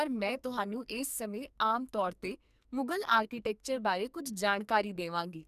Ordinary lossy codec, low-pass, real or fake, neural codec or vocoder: none; 14.4 kHz; fake; codec, 44.1 kHz, 2.6 kbps, SNAC